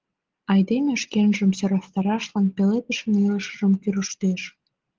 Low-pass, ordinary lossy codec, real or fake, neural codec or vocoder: 7.2 kHz; Opus, 16 kbps; real; none